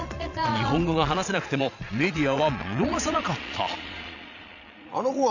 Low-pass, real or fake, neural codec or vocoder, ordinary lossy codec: 7.2 kHz; fake; vocoder, 22.05 kHz, 80 mel bands, Vocos; none